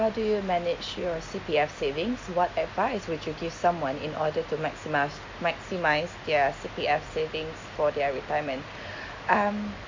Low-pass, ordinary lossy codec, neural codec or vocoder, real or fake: 7.2 kHz; MP3, 48 kbps; none; real